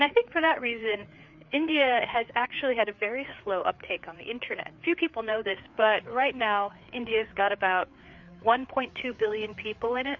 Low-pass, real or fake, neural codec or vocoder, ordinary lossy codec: 7.2 kHz; fake; codec, 16 kHz, 4 kbps, FreqCodec, larger model; MP3, 48 kbps